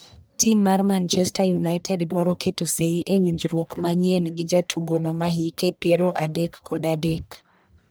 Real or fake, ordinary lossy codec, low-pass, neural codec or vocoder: fake; none; none; codec, 44.1 kHz, 1.7 kbps, Pupu-Codec